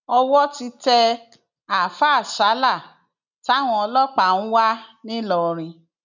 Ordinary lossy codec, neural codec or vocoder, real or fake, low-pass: none; none; real; 7.2 kHz